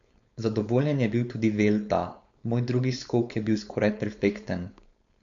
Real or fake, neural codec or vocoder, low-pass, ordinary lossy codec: fake; codec, 16 kHz, 4.8 kbps, FACodec; 7.2 kHz; MP3, 64 kbps